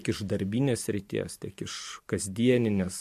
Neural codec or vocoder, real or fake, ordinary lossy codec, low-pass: vocoder, 44.1 kHz, 128 mel bands every 512 samples, BigVGAN v2; fake; MP3, 64 kbps; 14.4 kHz